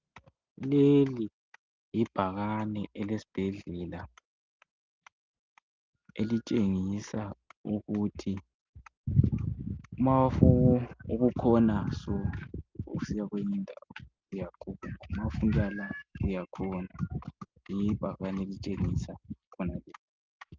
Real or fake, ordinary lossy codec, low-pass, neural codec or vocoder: real; Opus, 24 kbps; 7.2 kHz; none